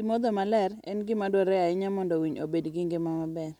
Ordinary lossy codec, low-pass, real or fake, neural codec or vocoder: none; 19.8 kHz; real; none